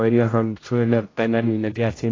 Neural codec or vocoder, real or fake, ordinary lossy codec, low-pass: codec, 16 kHz, 0.5 kbps, X-Codec, HuBERT features, trained on general audio; fake; AAC, 32 kbps; 7.2 kHz